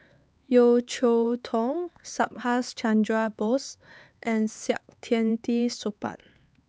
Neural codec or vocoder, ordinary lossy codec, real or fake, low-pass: codec, 16 kHz, 4 kbps, X-Codec, HuBERT features, trained on LibriSpeech; none; fake; none